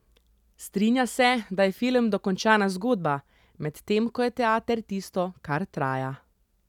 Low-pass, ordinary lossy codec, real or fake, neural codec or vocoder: 19.8 kHz; none; real; none